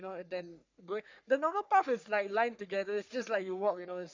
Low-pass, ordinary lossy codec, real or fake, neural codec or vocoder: 7.2 kHz; MP3, 64 kbps; fake; codec, 44.1 kHz, 3.4 kbps, Pupu-Codec